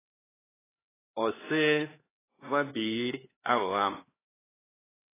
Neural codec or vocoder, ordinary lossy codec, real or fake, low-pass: codec, 16 kHz, 2 kbps, X-Codec, HuBERT features, trained on LibriSpeech; AAC, 16 kbps; fake; 3.6 kHz